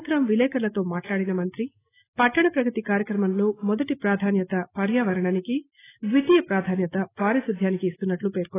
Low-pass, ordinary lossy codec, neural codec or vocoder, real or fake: 3.6 kHz; AAC, 16 kbps; none; real